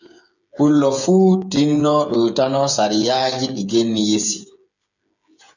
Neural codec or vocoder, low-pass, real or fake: codec, 16 kHz, 8 kbps, FreqCodec, smaller model; 7.2 kHz; fake